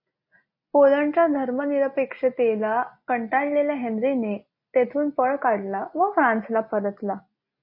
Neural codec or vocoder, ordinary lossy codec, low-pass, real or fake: none; MP3, 32 kbps; 5.4 kHz; real